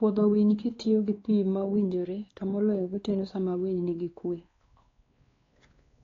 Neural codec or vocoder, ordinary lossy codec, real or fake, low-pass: codec, 16 kHz, 2 kbps, X-Codec, WavLM features, trained on Multilingual LibriSpeech; AAC, 32 kbps; fake; 7.2 kHz